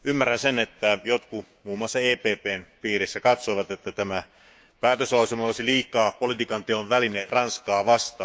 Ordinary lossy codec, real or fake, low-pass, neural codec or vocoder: none; fake; none; codec, 16 kHz, 6 kbps, DAC